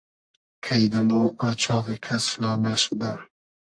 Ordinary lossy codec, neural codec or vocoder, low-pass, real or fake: AAC, 64 kbps; codec, 44.1 kHz, 1.7 kbps, Pupu-Codec; 9.9 kHz; fake